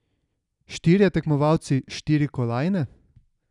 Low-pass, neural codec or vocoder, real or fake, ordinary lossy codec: 10.8 kHz; none; real; none